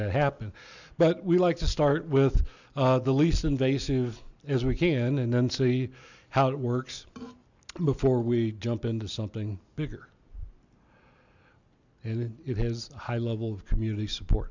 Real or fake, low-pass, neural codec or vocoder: real; 7.2 kHz; none